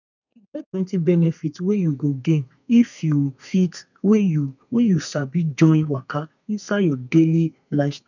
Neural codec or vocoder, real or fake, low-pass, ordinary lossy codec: codec, 32 kHz, 1.9 kbps, SNAC; fake; 7.2 kHz; none